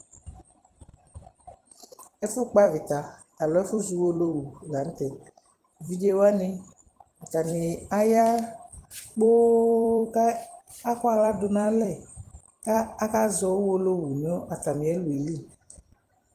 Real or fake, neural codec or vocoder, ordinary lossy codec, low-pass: fake; vocoder, 44.1 kHz, 128 mel bands every 256 samples, BigVGAN v2; Opus, 24 kbps; 14.4 kHz